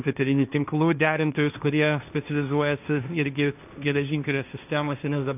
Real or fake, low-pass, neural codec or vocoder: fake; 3.6 kHz; codec, 16 kHz, 1.1 kbps, Voila-Tokenizer